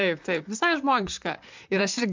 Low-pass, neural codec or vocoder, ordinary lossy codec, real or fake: 7.2 kHz; vocoder, 44.1 kHz, 128 mel bands, Pupu-Vocoder; MP3, 64 kbps; fake